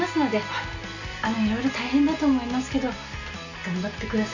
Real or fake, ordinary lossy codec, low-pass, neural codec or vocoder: real; none; 7.2 kHz; none